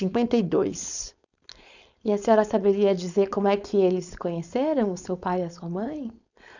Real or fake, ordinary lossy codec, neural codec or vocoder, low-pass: fake; none; codec, 16 kHz, 4.8 kbps, FACodec; 7.2 kHz